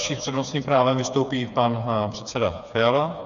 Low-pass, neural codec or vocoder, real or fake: 7.2 kHz; codec, 16 kHz, 4 kbps, FreqCodec, smaller model; fake